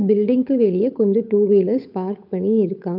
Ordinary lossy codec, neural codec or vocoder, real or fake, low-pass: none; codec, 16 kHz, 8 kbps, FunCodec, trained on Chinese and English, 25 frames a second; fake; 5.4 kHz